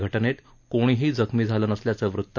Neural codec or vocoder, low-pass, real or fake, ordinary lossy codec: none; 7.2 kHz; real; none